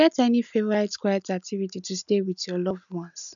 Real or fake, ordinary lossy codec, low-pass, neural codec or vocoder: real; MP3, 96 kbps; 7.2 kHz; none